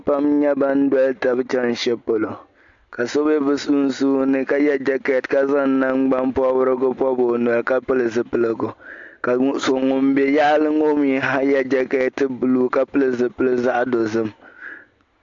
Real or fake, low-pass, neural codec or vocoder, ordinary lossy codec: real; 7.2 kHz; none; AAC, 64 kbps